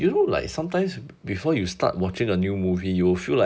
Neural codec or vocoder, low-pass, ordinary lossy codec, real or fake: none; none; none; real